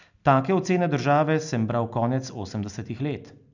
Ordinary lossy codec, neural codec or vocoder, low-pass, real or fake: none; none; 7.2 kHz; real